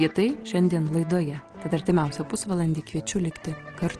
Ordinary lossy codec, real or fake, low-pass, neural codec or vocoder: Opus, 16 kbps; real; 9.9 kHz; none